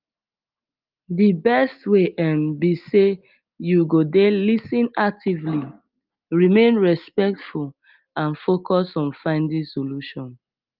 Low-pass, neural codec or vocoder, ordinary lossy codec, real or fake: 5.4 kHz; none; Opus, 32 kbps; real